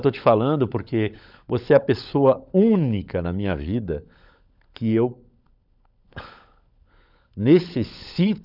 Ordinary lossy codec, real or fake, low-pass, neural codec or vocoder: none; fake; 5.4 kHz; codec, 16 kHz, 16 kbps, FunCodec, trained on LibriTTS, 50 frames a second